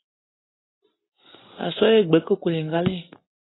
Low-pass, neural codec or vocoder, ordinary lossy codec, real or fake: 7.2 kHz; none; AAC, 16 kbps; real